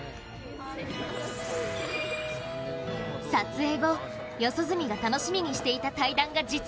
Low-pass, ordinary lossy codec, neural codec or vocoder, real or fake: none; none; none; real